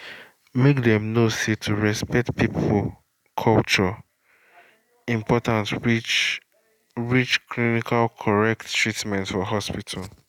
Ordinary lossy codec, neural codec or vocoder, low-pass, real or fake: none; none; 19.8 kHz; real